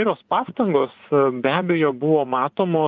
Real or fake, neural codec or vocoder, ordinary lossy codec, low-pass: fake; vocoder, 24 kHz, 100 mel bands, Vocos; Opus, 24 kbps; 7.2 kHz